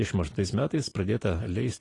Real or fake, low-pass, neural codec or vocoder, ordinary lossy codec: real; 10.8 kHz; none; AAC, 32 kbps